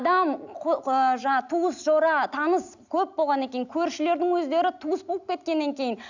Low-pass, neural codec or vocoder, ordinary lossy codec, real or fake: 7.2 kHz; none; none; real